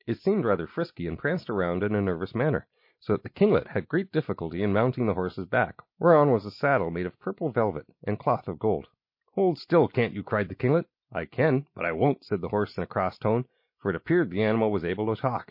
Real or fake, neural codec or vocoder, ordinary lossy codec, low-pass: real; none; MP3, 32 kbps; 5.4 kHz